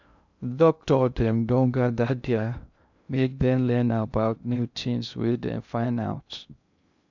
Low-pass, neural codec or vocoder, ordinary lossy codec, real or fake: 7.2 kHz; codec, 16 kHz in and 24 kHz out, 0.6 kbps, FocalCodec, streaming, 2048 codes; none; fake